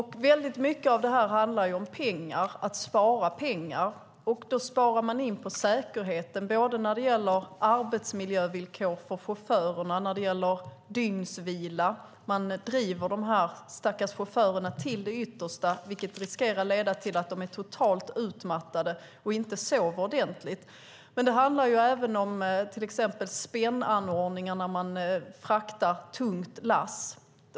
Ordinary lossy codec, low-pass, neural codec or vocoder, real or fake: none; none; none; real